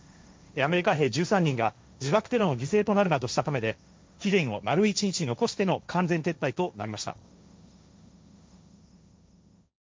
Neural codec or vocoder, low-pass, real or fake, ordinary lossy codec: codec, 16 kHz, 1.1 kbps, Voila-Tokenizer; none; fake; none